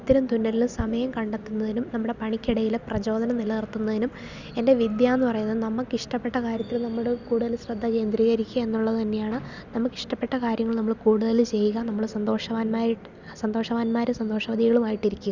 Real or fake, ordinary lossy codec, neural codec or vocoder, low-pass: real; Opus, 64 kbps; none; 7.2 kHz